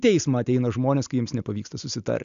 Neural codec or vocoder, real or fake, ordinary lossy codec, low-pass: none; real; AAC, 96 kbps; 7.2 kHz